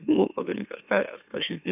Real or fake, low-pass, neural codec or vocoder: fake; 3.6 kHz; autoencoder, 44.1 kHz, a latent of 192 numbers a frame, MeloTTS